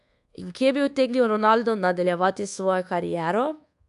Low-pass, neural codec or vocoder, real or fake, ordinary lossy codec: 10.8 kHz; codec, 24 kHz, 1.2 kbps, DualCodec; fake; AAC, 96 kbps